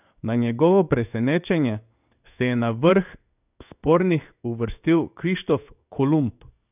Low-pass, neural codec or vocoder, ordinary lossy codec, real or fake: 3.6 kHz; codec, 16 kHz in and 24 kHz out, 1 kbps, XY-Tokenizer; none; fake